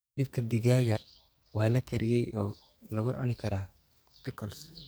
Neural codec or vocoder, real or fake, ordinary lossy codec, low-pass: codec, 44.1 kHz, 2.6 kbps, SNAC; fake; none; none